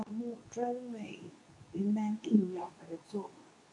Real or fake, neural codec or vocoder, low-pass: fake; codec, 24 kHz, 0.9 kbps, WavTokenizer, medium speech release version 2; 10.8 kHz